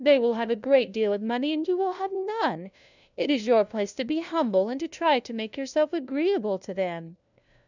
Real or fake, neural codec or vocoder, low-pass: fake; codec, 16 kHz, 1 kbps, FunCodec, trained on LibriTTS, 50 frames a second; 7.2 kHz